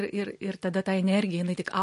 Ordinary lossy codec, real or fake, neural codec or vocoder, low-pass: MP3, 48 kbps; fake; vocoder, 44.1 kHz, 128 mel bands every 256 samples, BigVGAN v2; 14.4 kHz